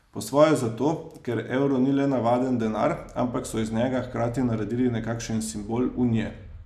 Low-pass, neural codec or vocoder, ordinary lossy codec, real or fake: 14.4 kHz; none; none; real